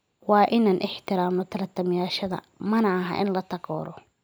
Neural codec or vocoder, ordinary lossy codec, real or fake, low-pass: none; none; real; none